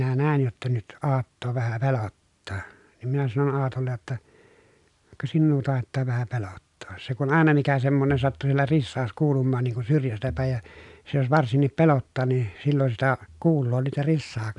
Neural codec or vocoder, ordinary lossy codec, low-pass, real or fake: none; none; 10.8 kHz; real